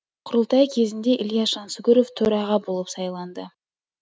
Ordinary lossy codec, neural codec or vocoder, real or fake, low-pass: none; none; real; none